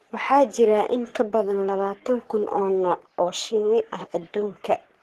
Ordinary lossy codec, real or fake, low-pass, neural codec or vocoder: Opus, 16 kbps; fake; 14.4 kHz; codec, 44.1 kHz, 3.4 kbps, Pupu-Codec